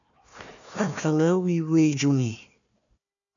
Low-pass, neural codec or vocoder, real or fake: 7.2 kHz; codec, 16 kHz, 1 kbps, FunCodec, trained on Chinese and English, 50 frames a second; fake